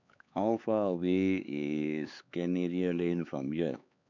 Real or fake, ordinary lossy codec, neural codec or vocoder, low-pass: fake; none; codec, 16 kHz, 4 kbps, X-Codec, HuBERT features, trained on LibriSpeech; 7.2 kHz